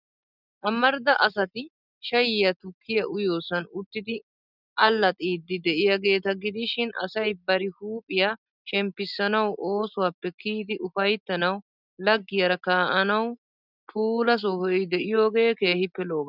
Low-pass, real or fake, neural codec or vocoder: 5.4 kHz; real; none